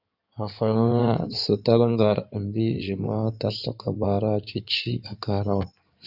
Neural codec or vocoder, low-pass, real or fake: codec, 16 kHz in and 24 kHz out, 2.2 kbps, FireRedTTS-2 codec; 5.4 kHz; fake